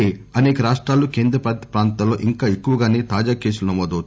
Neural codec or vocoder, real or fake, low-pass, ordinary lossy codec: none; real; none; none